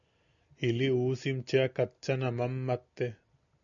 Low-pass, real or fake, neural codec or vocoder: 7.2 kHz; real; none